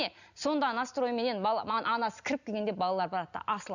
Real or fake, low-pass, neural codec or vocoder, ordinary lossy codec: real; 7.2 kHz; none; none